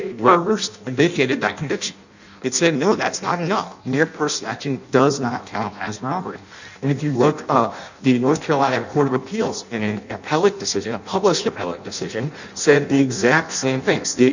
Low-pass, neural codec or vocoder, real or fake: 7.2 kHz; codec, 16 kHz in and 24 kHz out, 0.6 kbps, FireRedTTS-2 codec; fake